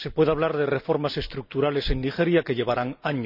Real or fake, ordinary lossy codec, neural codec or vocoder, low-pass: real; none; none; 5.4 kHz